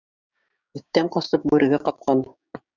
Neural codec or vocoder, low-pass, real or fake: codec, 16 kHz, 6 kbps, DAC; 7.2 kHz; fake